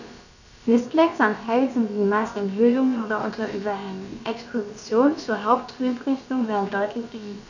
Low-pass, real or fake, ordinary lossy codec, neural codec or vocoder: 7.2 kHz; fake; none; codec, 16 kHz, about 1 kbps, DyCAST, with the encoder's durations